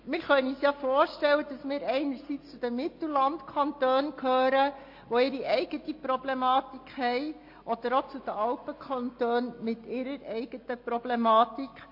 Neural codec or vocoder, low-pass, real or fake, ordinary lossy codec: none; 5.4 kHz; real; MP3, 32 kbps